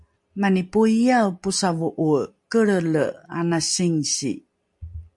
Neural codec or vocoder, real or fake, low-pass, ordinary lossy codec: none; real; 10.8 kHz; MP3, 64 kbps